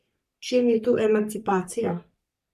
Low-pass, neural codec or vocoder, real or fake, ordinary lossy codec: 14.4 kHz; codec, 44.1 kHz, 3.4 kbps, Pupu-Codec; fake; none